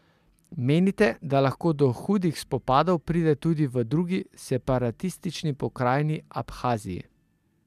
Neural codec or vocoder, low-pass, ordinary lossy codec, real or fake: none; 14.4 kHz; MP3, 96 kbps; real